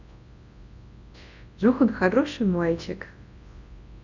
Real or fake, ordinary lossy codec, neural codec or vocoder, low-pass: fake; MP3, 64 kbps; codec, 24 kHz, 0.9 kbps, WavTokenizer, large speech release; 7.2 kHz